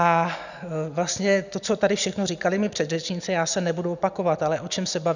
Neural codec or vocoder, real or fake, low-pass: none; real; 7.2 kHz